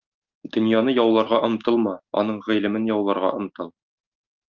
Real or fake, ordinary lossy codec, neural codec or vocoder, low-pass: real; Opus, 24 kbps; none; 7.2 kHz